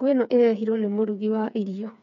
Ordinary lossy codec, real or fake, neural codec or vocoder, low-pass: none; fake; codec, 16 kHz, 4 kbps, FreqCodec, smaller model; 7.2 kHz